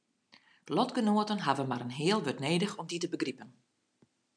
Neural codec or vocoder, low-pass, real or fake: none; 9.9 kHz; real